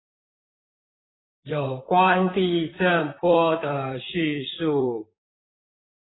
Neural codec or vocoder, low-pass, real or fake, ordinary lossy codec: vocoder, 44.1 kHz, 128 mel bands, Pupu-Vocoder; 7.2 kHz; fake; AAC, 16 kbps